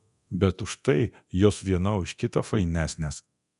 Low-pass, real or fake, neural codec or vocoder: 10.8 kHz; fake; codec, 24 kHz, 0.9 kbps, DualCodec